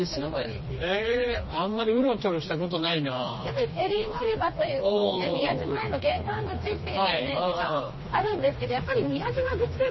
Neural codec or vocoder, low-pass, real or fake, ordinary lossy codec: codec, 16 kHz, 2 kbps, FreqCodec, smaller model; 7.2 kHz; fake; MP3, 24 kbps